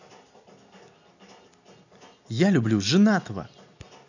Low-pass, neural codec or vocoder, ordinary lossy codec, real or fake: 7.2 kHz; none; none; real